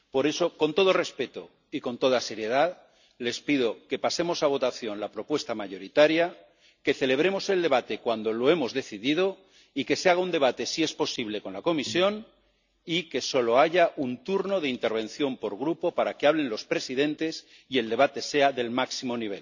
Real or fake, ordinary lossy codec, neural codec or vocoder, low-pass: real; none; none; 7.2 kHz